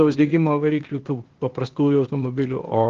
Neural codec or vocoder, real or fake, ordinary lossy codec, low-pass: codec, 16 kHz, 0.8 kbps, ZipCodec; fake; Opus, 16 kbps; 7.2 kHz